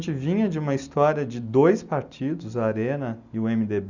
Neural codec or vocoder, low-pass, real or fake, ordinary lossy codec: none; 7.2 kHz; real; none